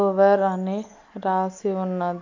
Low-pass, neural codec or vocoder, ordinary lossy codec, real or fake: 7.2 kHz; none; AAC, 48 kbps; real